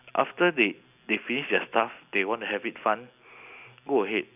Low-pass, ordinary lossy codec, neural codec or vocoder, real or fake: 3.6 kHz; none; none; real